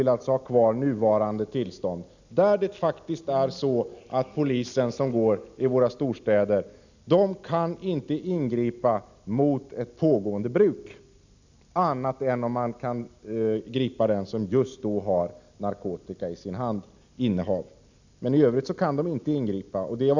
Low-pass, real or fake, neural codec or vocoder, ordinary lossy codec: 7.2 kHz; real; none; none